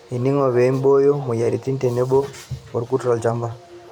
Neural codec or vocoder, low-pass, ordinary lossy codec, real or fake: vocoder, 44.1 kHz, 128 mel bands every 512 samples, BigVGAN v2; 19.8 kHz; none; fake